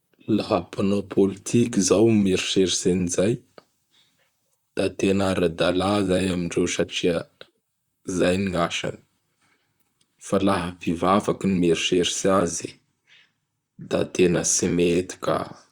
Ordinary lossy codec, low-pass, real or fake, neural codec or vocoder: Opus, 64 kbps; 19.8 kHz; fake; vocoder, 44.1 kHz, 128 mel bands, Pupu-Vocoder